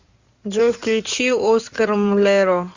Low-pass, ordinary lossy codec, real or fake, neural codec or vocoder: 7.2 kHz; Opus, 64 kbps; fake; codec, 16 kHz in and 24 kHz out, 2.2 kbps, FireRedTTS-2 codec